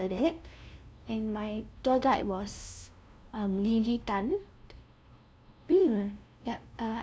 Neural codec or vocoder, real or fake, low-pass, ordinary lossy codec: codec, 16 kHz, 0.5 kbps, FunCodec, trained on LibriTTS, 25 frames a second; fake; none; none